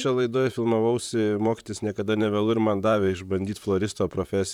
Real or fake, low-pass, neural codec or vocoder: fake; 19.8 kHz; vocoder, 44.1 kHz, 128 mel bands, Pupu-Vocoder